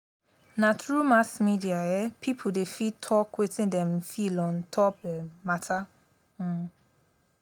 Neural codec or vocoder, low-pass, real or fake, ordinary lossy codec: none; none; real; none